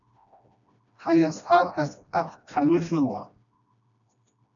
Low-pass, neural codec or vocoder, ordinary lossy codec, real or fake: 7.2 kHz; codec, 16 kHz, 1 kbps, FreqCodec, smaller model; MP3, 96 kbps; fake